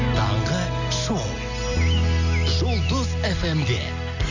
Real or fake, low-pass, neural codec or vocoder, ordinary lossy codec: real; 7.2 kHz; none; none